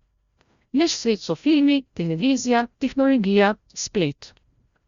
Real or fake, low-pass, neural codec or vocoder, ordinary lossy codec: fake; 7.2 kHz; codec, 16 kHz, 0.5 kbps, FreqCodec, larger model; Opus, 64 kbps